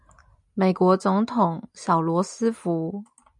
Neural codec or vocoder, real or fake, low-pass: none; real; 10.8 kHz